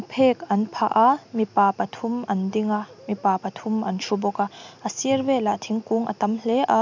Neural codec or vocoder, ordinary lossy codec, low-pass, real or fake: none; MP3, 64 kbps; 7.2 kHz; real